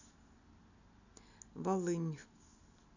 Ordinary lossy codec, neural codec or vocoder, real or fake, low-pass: none; none; real; 7.2 kHz